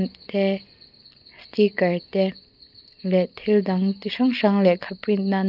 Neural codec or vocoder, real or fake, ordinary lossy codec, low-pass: none; real; Opus, 24 kbps; 5.4 kHz